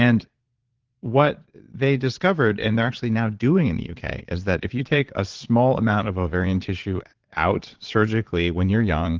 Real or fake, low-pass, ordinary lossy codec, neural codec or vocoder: fake; 7.2 kHz; Opus, 16 kbps; vocoder, 22.05 kHz, 80 mel bands, Vocos